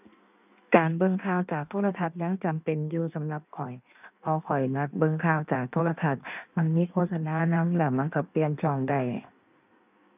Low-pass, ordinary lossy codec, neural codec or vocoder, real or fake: 3.6 kHz; none; codec, 16 kHz in and 24 kHz out, 1.1 kbps, FireRedTTS-2 codec; fake